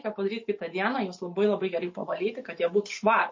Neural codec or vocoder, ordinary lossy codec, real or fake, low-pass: none; MP3, 32 kbps; real; 7.2 kHz